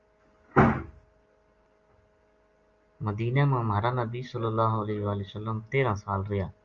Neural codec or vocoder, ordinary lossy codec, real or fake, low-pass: none; Opus, 32 kbps; real; 7.2 kHz